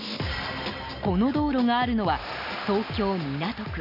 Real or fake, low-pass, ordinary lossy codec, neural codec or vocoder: real; 5.4 kHz; none; none